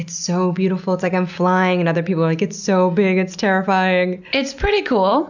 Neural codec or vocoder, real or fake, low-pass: none; real; 7.2 kHz